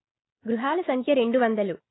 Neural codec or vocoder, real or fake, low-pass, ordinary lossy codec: none; real; 7.2 kHz; AAC, 16 kbps